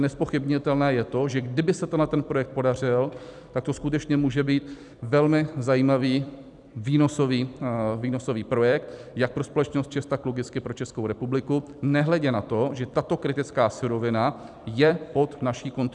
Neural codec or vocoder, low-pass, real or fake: vocoder, 44.1 kHz, 128 mel bands every 256 samples, BigVGAN v2; 10.8 kHz; fake